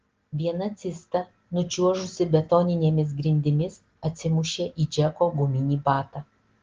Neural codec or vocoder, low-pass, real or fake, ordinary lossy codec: none; 7.2 kHz; real; Opus, 16 kbps